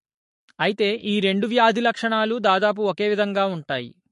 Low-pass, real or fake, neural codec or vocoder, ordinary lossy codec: 14.4 kHz; fake; codec, 44.1 kHz, 7.8 kbps, Pupu-Codec; MP3, 48 kbps